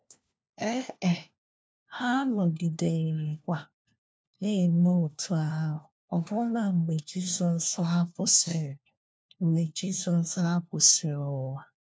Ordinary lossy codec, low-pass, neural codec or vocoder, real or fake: none; none; codec, 16 kHz, 1 kbps, FunCodec, trained on LibriTTS, 50 frames a second; fake